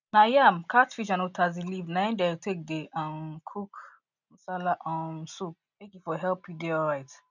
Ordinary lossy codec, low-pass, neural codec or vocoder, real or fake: none; 7.2 kHz; none; real